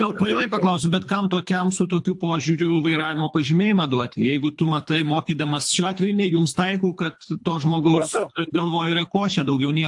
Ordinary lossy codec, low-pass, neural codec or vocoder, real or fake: AAC, 64 kbps; 10.8 kHz; codec, 24 kHz, 3 kbps, HILCodec; fake